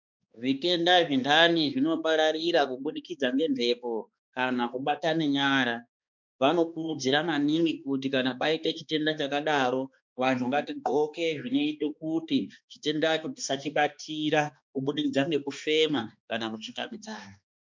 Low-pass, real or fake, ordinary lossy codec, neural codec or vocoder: 7.2 kHz; fake; MP3, 64 kbps; codec, 16 kHz, 2 kbps, X-Codec, HuBERT features, trained on balanced general audio